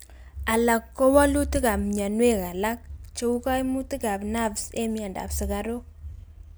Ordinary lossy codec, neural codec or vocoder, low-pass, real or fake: none; none; none; real